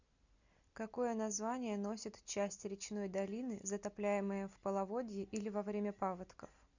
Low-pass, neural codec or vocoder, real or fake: 7.2 kHz; none; real